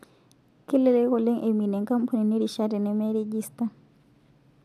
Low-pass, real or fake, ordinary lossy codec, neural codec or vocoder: 14.4 kHz; real; none; none